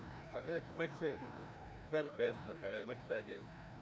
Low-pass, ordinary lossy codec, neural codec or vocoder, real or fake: none; none; codec, 16 kHz, 1 kbps, FreqCodec, larger model; fake